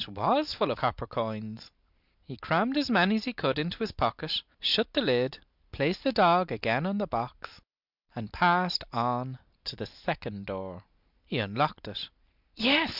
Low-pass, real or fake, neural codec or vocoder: 5.4 kHz; real; none